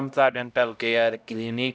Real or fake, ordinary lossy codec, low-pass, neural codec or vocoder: fake; none; none; codec, 16 kHz, 0.5 kbps, X-Codec, HuBERT features, trained on LibriSpeech